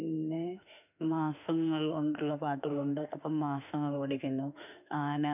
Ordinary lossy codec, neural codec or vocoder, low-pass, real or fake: none; autoencoder, 48 kHz, 32 numbers a frame, DAC-VAE, trained on Japanese speech; 3.6 kHz; fake